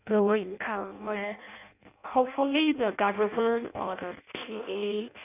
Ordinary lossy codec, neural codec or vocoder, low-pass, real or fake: none; codec, 16 kHz in and 24 kHz out, 0.6 kbps, FireRedTTS-2 codec; 3.6 kHz; fake